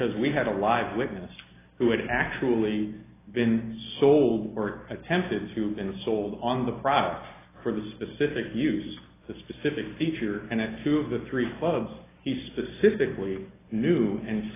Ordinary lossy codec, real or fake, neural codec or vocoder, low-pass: AAC, 16 kbps; real; none; 3.6 kHz